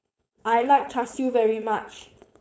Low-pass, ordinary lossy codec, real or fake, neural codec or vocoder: none; none; fake; codec, 16 kHz, 4.8 kbps, FACodec